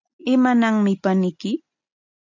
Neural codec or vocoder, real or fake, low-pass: none; real; 7.2 kHz